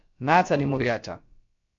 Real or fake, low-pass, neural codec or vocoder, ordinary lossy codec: fake; 7.2 kHz; codec, 16 kHz, about 1 kbps, DyCAST, with the encoder's durations; MP3, 48 kbps